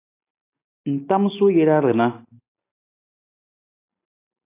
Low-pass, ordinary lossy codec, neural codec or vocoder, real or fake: 3.6 kHz; AAC, 24 kbps; none; real